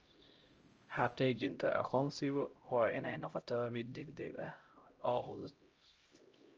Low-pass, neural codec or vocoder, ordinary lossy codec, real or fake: 7.2 kHz; codec, 16 kHz, 0.5 kbps, X-Codec, HuBERT features, trained on LibriSpeech; Opus, 24 kbps; fake